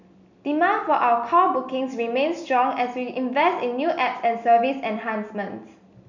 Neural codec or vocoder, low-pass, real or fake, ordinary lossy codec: none; 7.2 kHz; real; none